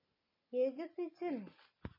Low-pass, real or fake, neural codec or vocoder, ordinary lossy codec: 5.4 kHz; real; none; MP3, 24 kbps